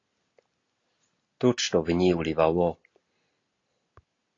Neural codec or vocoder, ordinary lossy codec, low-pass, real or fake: none; AAC, 32 kbps; 7.2 kHz; real